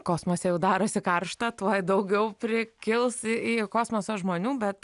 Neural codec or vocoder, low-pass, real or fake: none; 10.8 kHz; real